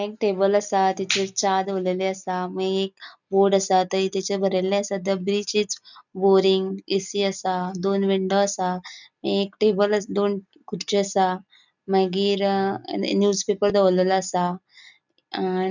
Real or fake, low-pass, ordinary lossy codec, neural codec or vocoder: real; 7.2 kHz; none; none